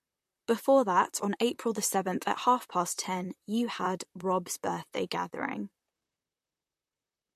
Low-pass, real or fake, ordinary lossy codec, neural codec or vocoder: 14.4 kHz; fake; MP3, 64 kbps; vocoder, 44.1 kHz, 128 mel bands, Pupu-Vocoder